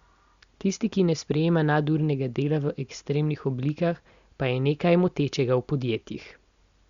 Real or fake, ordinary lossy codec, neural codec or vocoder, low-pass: real; Opus, 64 kbps; none; 7.2 kHz